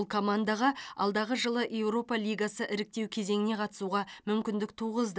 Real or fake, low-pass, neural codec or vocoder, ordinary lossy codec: real; none; none; none